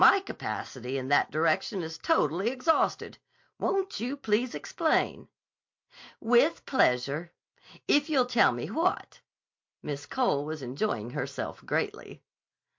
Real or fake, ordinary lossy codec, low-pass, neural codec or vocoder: real; MP3, 48 kbps; 7.2 kHz; none